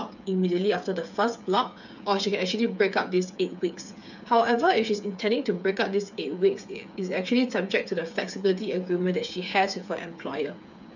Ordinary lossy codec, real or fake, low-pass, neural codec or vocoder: none; fake; 7.2 kHz; codec, 16 kHz, 8 kbps, FreqCodec, smaller model